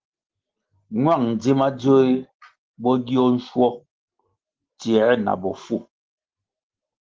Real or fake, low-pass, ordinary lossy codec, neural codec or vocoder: real; 7.2 kHz; Opus, 16 kbps; none